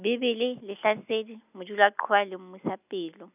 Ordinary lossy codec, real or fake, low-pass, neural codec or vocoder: none; real; 3.6 kHz; none